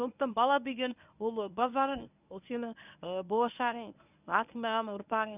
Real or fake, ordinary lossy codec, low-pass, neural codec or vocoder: fake; none; 3.6 kHz; codec, 24 kHz, 0.9 kbps, WavTokenizer, medium speech release version 1